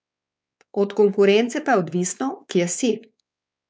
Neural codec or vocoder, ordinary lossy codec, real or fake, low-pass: codec, 16 kHz, 4 kbps, X-Codec, WavLM features, trained on Multilingual LibriSpeech; none; fake; none